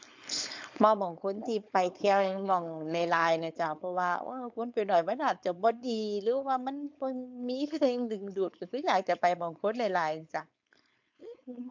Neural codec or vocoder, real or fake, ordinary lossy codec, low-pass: codec, 16 kHz, 4.8 kbps, FACodec; fake; MP3, 64 kbps; 7.2 kHz